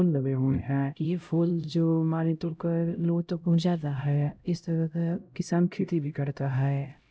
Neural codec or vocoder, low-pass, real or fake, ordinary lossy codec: codec, 16 kHz, 0.5 kbps, X-Codec, HuBERT features, trained on LibriSpeech; none; fake; none